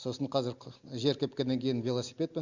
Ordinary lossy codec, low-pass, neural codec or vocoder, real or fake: Opus, 64 kbps; 7.2 kHz; none; real